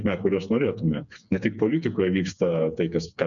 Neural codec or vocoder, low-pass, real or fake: codec, 16 kHz, 4 kbps, FreqCodec, smaller model; 7.2 kHz; fake